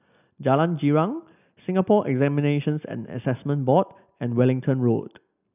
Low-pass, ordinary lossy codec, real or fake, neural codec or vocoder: 3.6 kHz; none; real; none